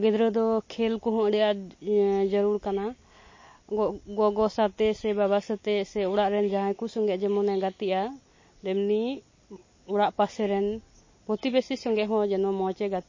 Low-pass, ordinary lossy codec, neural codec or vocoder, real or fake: 7.2 kHz; MP3, 32 kbps; none; real